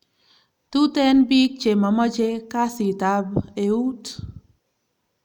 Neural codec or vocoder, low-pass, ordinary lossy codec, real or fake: none; 19.8 kHz; none; real